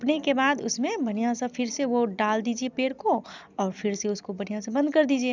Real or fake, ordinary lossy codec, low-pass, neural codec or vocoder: real; none; 7.2 kHz; none